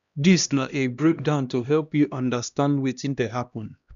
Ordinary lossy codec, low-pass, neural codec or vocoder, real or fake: none; 7.2 kHz; codec, 16 kHz, 1 kbps, X-Codec, HuBERT features, trained on LibriSpeech; fake